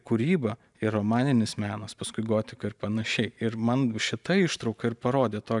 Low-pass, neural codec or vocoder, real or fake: 10.8 kHz; vocoder, 44.1 kHz, 128 mel bands every 512 samples, BigVGAN v2; fake